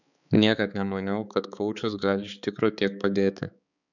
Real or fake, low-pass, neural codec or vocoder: fake; 7.2 kHz; codec, 16 kHz, 4 kbps, X-Codec, HuBERT features, trained on balanced general audio